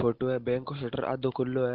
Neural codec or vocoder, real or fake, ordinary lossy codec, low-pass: none; real; Opus, 16 kbps; 5.4 kHz